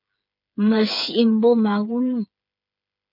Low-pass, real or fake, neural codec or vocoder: 5.4 kHz; fake; codec, 16 kHz, 8 kbps, FreqCodec, smaller model